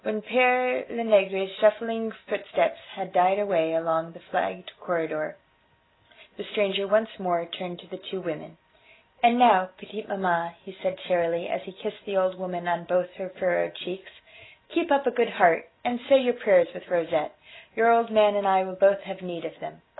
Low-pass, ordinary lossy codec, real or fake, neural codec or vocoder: 7.2 kHz; AAC, 16 kbps; real; none